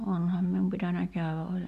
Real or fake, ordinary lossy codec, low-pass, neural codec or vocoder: real; AAC, 96 kbps; 14.4 kHz; none